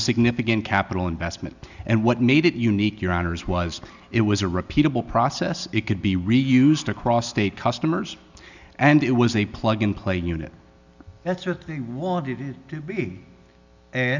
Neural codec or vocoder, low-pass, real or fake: none; 7.2 kHz; real